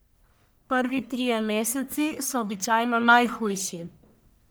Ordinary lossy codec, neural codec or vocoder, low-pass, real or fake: none; codec, 44.1 kHz, 1.7 kbps, Pupu-Codec; none; fake